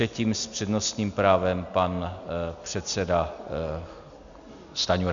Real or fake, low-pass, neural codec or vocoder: real; 7.2 kHz; none